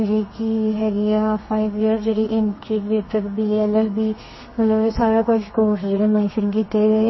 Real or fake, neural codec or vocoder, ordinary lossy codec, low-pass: fake; codec, 32 kHz, 1.9 kbps, SNAC; MP3, 24 kbps; 7.2 kHz